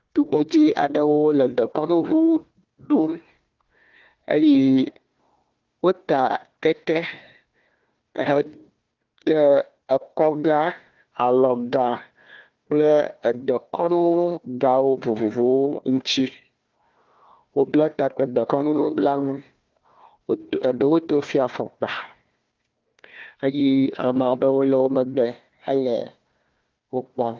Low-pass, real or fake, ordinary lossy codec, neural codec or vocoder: 7.2 kHz; fake; Opus, 32 kbps; codec, 16 kHz, 1 kbps, FunCodec, trained on Chinese and English, 50 frames a second